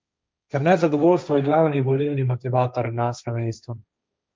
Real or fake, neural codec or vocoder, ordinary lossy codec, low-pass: fake; codec, 16 kHz, 1.1 kbps, Voila-Tokenizer; none; none